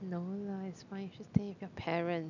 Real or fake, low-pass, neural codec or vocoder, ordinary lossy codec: real; 7.2 kHz; none; none